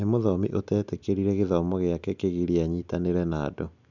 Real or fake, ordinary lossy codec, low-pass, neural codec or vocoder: real; none; 7.2 kHz; none